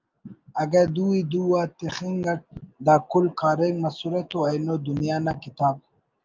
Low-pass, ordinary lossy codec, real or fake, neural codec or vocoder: 7.2 kHz; Opus, 24 kbps; real; none